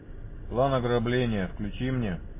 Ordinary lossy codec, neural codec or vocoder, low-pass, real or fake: MP3, 16 kbps; none; 3.6 kHz; real